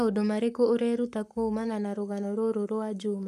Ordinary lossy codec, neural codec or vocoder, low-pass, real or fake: none; codec, 44.1 kHz, 7.8 kbps, Pupu-Codec; 14.4 kHz; fake